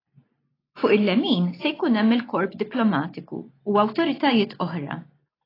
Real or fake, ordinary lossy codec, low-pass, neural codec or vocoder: real; AAC, 24 kbps; 5.4 kHz; none